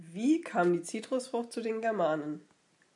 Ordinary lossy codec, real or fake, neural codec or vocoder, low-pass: MP3, 96 kbps; real; none; 10.8 kHz